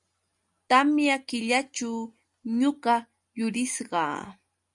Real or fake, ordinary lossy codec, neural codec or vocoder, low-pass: real; MP3, 96 kbps; none; 10.8 kHz